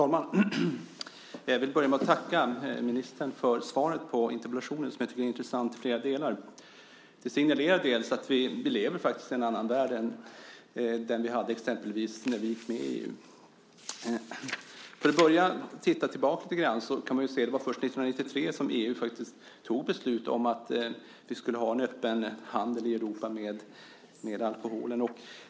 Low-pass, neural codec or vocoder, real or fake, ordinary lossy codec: none; none; real; none